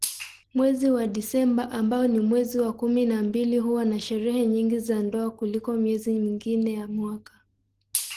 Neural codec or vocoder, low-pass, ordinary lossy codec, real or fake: none; 14.4 kHz; Opus, 16 kbps; real